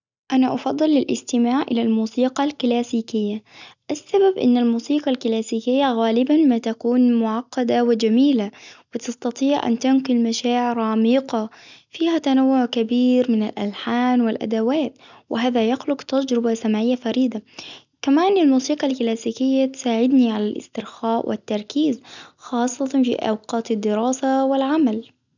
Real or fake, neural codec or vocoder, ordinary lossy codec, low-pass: real; none; none; 7.2 kHz